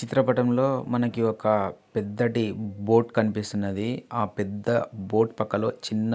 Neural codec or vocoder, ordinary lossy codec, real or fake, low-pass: none; none; real; none